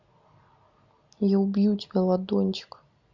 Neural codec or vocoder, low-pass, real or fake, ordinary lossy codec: none; 7.2 kHz; real; MP3, 64 kbps